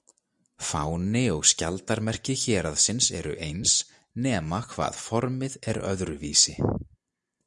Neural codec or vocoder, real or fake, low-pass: none; real; 10.8 kHz